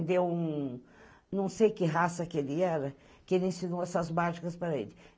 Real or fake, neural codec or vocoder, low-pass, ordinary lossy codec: real; none; none; none